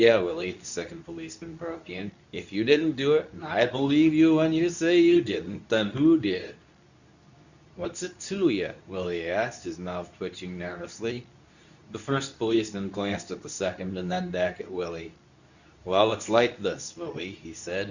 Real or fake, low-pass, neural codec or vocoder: fake; 7.2 kHz; codec, 24 kHz, 0.9 kbps, WavTokenizer, medium speech release version 2